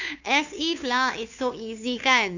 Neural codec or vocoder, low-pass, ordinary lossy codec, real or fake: codec, 16 kHz, 2 kbps, FunCodec, trained on Chinese and English, 25 frames a second; 7.2 kHz; none; fake